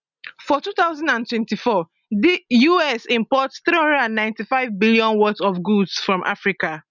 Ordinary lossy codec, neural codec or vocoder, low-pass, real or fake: none; none; 7.2 kHz; real